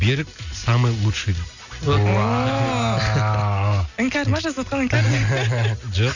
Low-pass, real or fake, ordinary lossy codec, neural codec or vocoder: 7.2 kHz; real; none; none